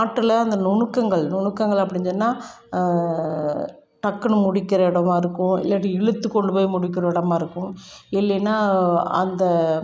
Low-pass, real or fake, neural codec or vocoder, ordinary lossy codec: none; real; none; none